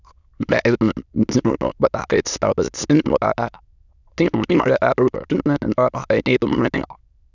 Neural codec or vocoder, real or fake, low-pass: autoencoder, 22.05 kHz, a latent of 192 numbers a frame, VITS, trained on many speakers; fake; 7.2 kHz